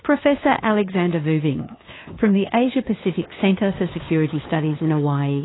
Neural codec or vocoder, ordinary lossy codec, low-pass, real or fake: codec, 16 kHz, 2 kbps, FunCodec, trained on LibriTTS, 25 frames a second; AAC, 16 kbps; 7.2 kHz; fake